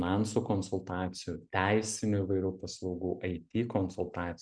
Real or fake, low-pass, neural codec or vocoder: real; 9.9 kHz; none